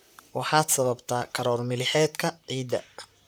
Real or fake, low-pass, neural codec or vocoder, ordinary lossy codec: fake; none; vocoder, 44.1 kHz, 128 mel bands, Pupu-Vocoder; none